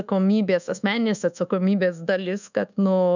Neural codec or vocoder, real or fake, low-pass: codec, 24 kHz, 1.2 kbps, DualCodec; fake; 7.2 kHz